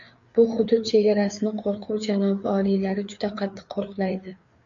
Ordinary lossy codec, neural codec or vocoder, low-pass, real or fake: MP3, 64 kbps; codec, 16 kHz, 4 kbps, FreqCodec, larger model; 7.2 kHz; fake